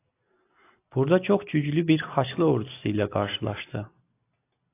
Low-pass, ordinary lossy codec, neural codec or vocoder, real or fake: 3.6 kHz; AAC, 24 kbps; none; real